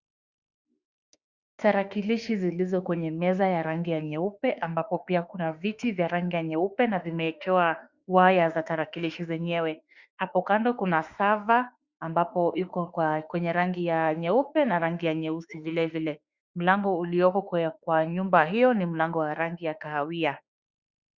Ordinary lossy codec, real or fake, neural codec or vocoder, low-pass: Opus, 64 kbps; fake; autoencoder, 48 kHz, 32 numbers a frame, DAC-VAE, trained on Japanese speech; 7.2 kHz